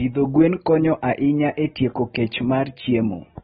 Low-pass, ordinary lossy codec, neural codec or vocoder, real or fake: 19.8 kHz; AAC, 16 kbps; none; real